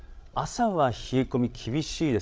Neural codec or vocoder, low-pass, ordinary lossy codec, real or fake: codec, 16 kHz, 16 kbps, FreqCodec, larger model; none; none; fake